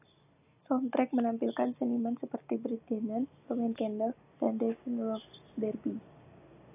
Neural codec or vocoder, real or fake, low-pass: none; real; 3.6 kHz